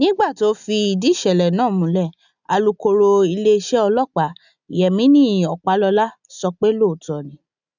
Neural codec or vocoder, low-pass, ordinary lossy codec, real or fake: none; 7.2 kHz; none; real